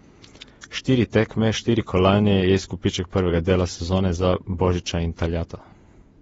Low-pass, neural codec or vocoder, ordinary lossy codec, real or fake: 19.8 kHz; vocoder, 48 kHz, 128 mel bands, Vocos; AAC, 24 kbps; fake